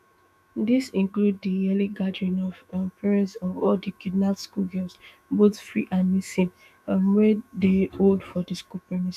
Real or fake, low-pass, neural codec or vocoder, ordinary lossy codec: fake; 14.4 kHz; autoencoder, 48 kHz, 128 numbers a frame, DAC-VAE, trained on Japanese speech; none